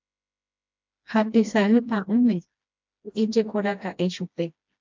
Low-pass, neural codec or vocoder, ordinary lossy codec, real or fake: 7.2 kHz; codec, 16 kHz, 1 kbps, FreqCodec, smaller model; MP3, 64 kbps; fake